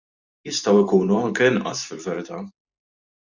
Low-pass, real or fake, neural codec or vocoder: 7.2 kHz; fake; vocoder, 44.1 kHz, 128 mel bands every 512 samples, BigVGAN v2